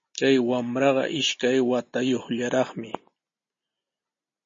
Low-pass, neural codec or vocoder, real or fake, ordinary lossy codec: 7.2 kHz; none; real; MP3, 32 kbps